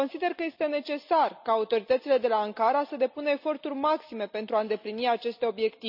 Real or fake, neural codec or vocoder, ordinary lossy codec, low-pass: real; none; none; 5.4 kHz